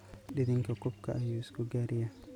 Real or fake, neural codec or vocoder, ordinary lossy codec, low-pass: real; none; none; 19.8 kHz